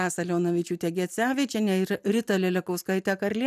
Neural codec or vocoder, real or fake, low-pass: none; real; 14.4 kHz